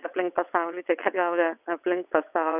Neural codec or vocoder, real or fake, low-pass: vocoder, 22.05 kHz, 80 mel bands, WaveNeXt; fake; 3.6 kHz